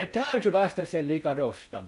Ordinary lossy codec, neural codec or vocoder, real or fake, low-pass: none; codec, 16 kHz in and 24 kHz out, 0.6 kbps, FocalCodec, streaming, 4096 codes; fake; 10.8 kHz